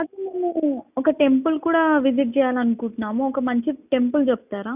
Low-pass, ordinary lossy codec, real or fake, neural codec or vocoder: 3.6 kHz; none; real; none